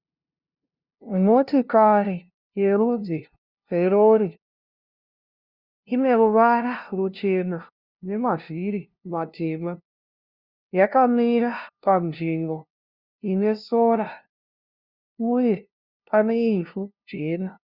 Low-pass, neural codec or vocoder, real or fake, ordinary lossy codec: 5.4 kHz; codec, 16 kHz, 0.5 kbps, FunCodec, trained on LibriTTS, 25 frames a second; fake; Opus, 64 kbps